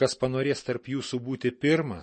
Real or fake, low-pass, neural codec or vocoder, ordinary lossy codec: real; 9.9 kHz; none; MP3, 32 kbps